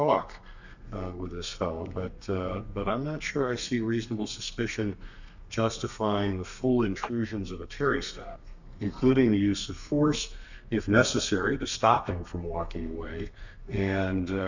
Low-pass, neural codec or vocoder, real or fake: 7.2 kHz; codec, 32 kHz, 1.9 kbps, SNAC; fake